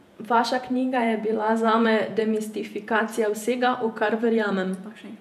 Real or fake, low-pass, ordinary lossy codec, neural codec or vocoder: fake; 14.4 kHz; none; vocoder, 48 kHz, 128 mel bands, Vocos